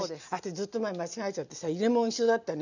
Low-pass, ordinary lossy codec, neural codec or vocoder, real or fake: 7.2 kHz; none; none; real